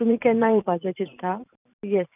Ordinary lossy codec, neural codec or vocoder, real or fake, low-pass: none; vocoder, 44.1 kHz, 128 mel bands every 512 samples, BigVGAN v2; fake; 3.6 kHz